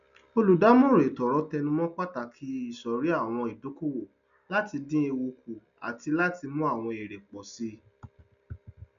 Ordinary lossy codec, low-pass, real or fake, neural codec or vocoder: none; 7.2 kHz; real; none